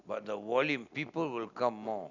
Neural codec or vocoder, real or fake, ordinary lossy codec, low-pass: none; real; none; 7.2 kHz